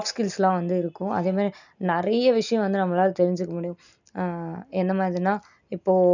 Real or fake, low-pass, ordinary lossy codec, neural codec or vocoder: real; 7.2 kHz; none; none